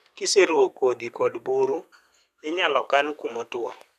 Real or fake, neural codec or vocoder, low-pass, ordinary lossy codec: fake; codec, 32 kHz, 1.9 kbps, SNAC; 14.4 kHz; none